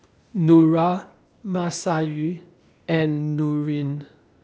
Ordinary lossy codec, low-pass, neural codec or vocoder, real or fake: none; none; codec, 16 kHz, 0.8 kbps, ZipCodec; fake